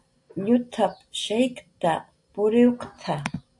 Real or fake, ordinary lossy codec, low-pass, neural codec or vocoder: real; AAC, 64 kbps; 10.8 kHz; none